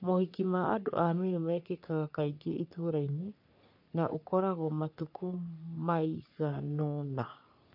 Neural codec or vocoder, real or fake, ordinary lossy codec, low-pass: codec, 44.1 kHz, 3.4 kbps, Pupu-Codec; fake; none; 5.4 kHz